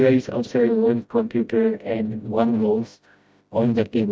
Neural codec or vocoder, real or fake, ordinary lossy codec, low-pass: codec, 16 kHz, 0.5 kbps, FreqCodec, smaller model; fake; none; none